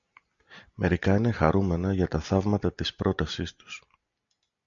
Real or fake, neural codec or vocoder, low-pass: real; none; 7.2 kHz